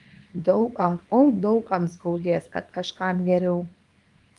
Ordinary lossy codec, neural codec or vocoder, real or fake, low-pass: Opus, 32 kbps; codec, 24 kHz, 0.9 kbps, WavTokenizer, small release; fake; 10.8 kHz